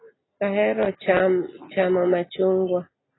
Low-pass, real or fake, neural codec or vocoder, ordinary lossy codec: 7.2 kHz; real; none; AAC, 16 kbps